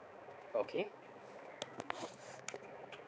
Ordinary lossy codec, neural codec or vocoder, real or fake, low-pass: none; codec, 16 kHz, 4 kbps, X-Codec, HuBERT features, trained on balanced general audio; fake; none